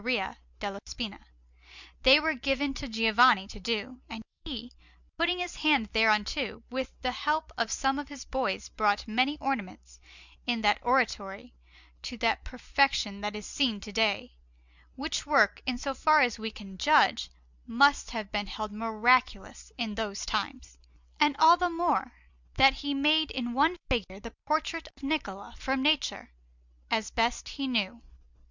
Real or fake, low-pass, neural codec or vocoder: real; 7.2 kHz; none